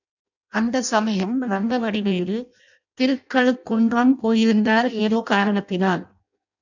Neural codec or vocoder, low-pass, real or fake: codec, 16 kHz in and 24 kHz out, 0.6 kbps, FireRedTTS-2 codec; 7.2 kHz; fake